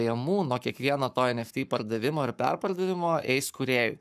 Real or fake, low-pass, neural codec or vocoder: fake; 14.4 kHz; codec, 44.1 kHz, 7.8 kbps, Pupu-Codec